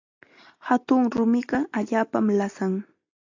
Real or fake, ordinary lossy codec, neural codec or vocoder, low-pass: real; AAC, 48 kbps; none; 7.2 kHz